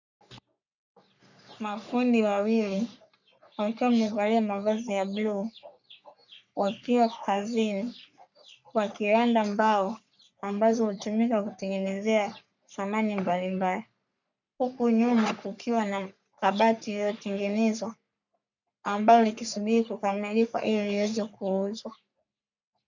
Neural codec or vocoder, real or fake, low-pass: codec, 44.1 kHz, 3.4 kbps, Pupu-Codec; fake; 7.2 kHz